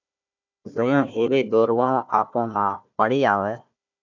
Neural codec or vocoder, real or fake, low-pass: codec, 16 kHz, 1 kbps, FunCodec, trained on Chinese and English, 50 frames a second; fake; 7.2 kHz